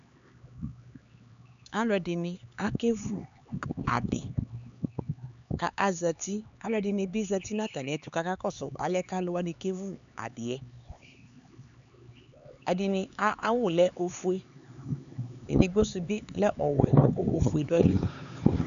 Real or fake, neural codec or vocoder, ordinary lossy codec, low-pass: fake; codec, 16 kHz, 4 kbps, X-Codec, HuBERT features, trained on LibriSpeech; AAC, 96 kbps; 7.2 kHz